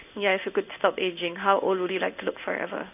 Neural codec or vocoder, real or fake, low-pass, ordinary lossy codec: codec, 16 kHz in and 24 kHz out, 1 kbps, XY-Tokenizer; fake; 3.6 kHz; none